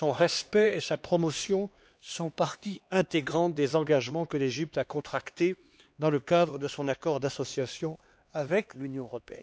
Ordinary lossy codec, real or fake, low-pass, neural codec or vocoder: none; fake; none; codec, 16 kHz, 2 kbps, X-Codec, HuBERT features, trained on LibriSpeech